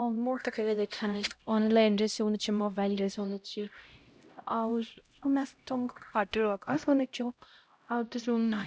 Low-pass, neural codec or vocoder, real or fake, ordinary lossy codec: none; codec, 16 kHz, 0.5 kbps, X-Codec, HuBERT features, trained on LibriSpeech; fake; none